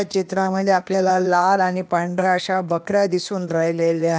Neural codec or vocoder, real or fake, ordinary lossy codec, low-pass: codec, 16 kHz, 0.8 kbps, ZipCodec; fake; none; none